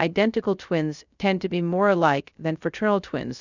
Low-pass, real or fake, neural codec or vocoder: 7.2 kHz; fake; codec, 16 kHz, 0.3 kbps, FocalCodec